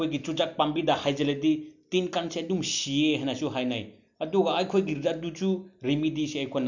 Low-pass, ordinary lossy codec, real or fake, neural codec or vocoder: 7.2 kHz; none; real; none